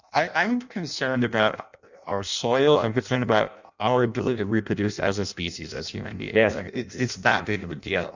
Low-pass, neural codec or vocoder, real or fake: 7.2 kHz; codec, 16 kHz in and 24 kHz out, 0.6 kbps, FireRedTTS-2 codec; fake